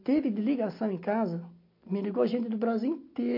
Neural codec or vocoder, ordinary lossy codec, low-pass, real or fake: none; MP3, 32 kbps; 5.4 kHz; real